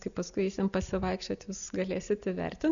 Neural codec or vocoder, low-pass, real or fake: none; 7.2 kHz; real